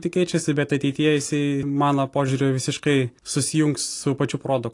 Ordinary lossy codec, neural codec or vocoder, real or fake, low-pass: AAC, 48 kbps; none; real; 10.8 kHz